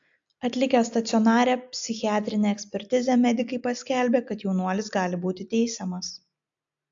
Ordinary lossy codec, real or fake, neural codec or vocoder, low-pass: AAC, 64 kbps; real; none; 7.2 kHz